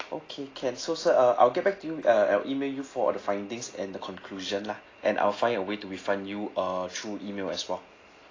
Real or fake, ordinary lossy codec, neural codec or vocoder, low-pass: real; AAC, 32 kbps; none; 7.2 kHz